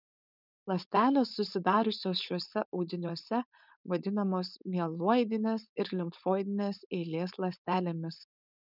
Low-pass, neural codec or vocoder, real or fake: 5.4 kHz; codec, 16 kHz, 4.8 kbps, FACodec; fake